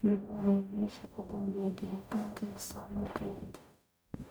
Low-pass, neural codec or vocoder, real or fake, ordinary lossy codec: none; codec, 44.1 kHz, 0.9 kbps, DAC; fake; none